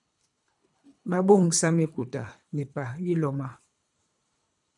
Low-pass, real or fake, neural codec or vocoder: 10.8 kHz; fake; codec, 24 kHz, 3 kbps, HILCodec